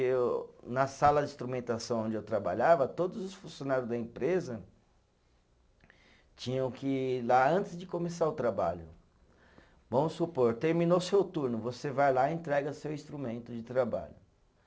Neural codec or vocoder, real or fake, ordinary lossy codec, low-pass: none; real; none; none